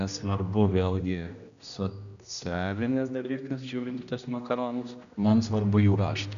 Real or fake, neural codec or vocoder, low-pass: fake; codec, 16 kHz, 1 kbps, X-Codec, HuBERT features, trained on general audio; 7.2 kHz